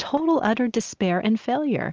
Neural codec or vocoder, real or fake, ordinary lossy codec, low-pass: none; real; Opus, 24 kbps; 7.2 kHz